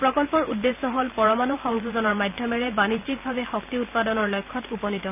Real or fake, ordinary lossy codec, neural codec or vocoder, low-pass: fake; none; vocoder, 44.1 kHz, 128 mel bands every 512 samples, BigVGAN v2; 3.6 kHz